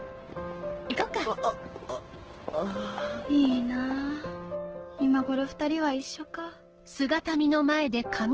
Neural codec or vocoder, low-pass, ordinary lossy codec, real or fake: codec, 16 kHz, 6 kbps, DAC; 7.2 kHz; Opus, 16 kbps; fake